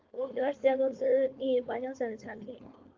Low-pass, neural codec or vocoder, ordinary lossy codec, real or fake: 7.2 kHz; codec, 16 kHz, 4.8 kbps, FACodec; Opus, 32 kbps; fake